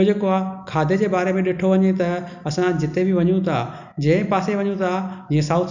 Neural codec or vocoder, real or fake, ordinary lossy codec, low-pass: none; real; none; 7.2 kHz